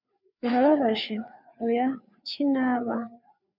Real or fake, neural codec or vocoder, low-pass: fake; codec, 16 kHz, 4 kbps, FreqCodec, larger model; 5.4 kHz